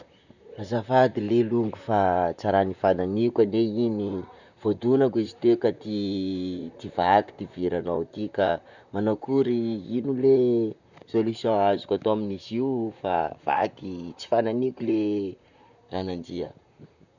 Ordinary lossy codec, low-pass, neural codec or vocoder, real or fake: none; 7.2 kHz; vocoder, 44.1 kHz, 128 mel bands, Pupu-Vocoder; fake